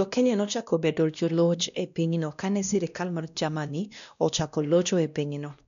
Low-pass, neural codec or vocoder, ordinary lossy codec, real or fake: 7.2 kHz; codec, 16 kHz, 1 kbps, X-Codec, WavLM features, trained on Multilingual LibriSpeech; none; fake